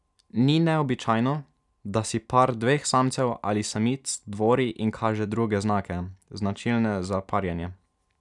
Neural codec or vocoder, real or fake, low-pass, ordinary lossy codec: none; real; 10.8 kHz; none